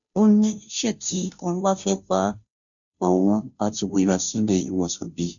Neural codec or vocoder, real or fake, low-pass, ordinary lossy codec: codec, 16 kHz, 0.5 kbps, FunCodec, trained on Chinese and English, 25 frames a second; fake; 7.2 kHz; none